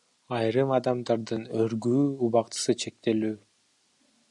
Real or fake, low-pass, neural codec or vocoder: real; 10.8 kHz; none